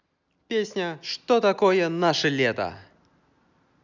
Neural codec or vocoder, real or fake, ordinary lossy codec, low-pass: none; real; none; 7.2 kHz